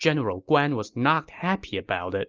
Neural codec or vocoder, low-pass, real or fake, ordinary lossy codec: none; 7.2 kHz; real; Opus, 32 kbps